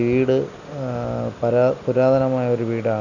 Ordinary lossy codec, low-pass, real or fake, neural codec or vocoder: none; 7.2 kHz; real; none